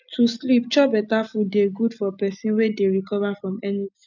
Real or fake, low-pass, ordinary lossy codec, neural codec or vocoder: real; 7.2 kHz; none; none